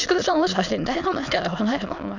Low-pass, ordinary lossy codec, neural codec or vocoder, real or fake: 7.2 kHz; none; autoencoder, 22.05 kHz, a latent of 192 numbers a frame, VITS, trained on many speakers; fake